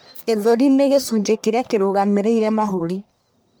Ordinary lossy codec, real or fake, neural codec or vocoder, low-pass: none; fake; codec, 44.1 kHz, 1.7 kbps, Pupu-Codec; none